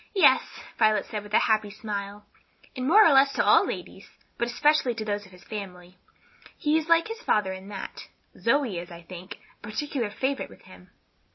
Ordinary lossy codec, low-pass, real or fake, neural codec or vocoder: MP3, 24 kbps; 7.2 kHz; real; none